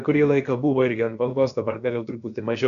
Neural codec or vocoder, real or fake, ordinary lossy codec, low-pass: codec, 16 kHz, about 1 kbps, DyCAST, with the encoder's durations; fake; MP3, 96 kbps; 7.2 kHz